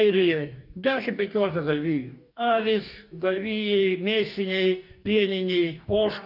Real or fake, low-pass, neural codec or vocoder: fake; 5.4 kHz; codec, 44.1 kHz, 2.6 kbps, DAC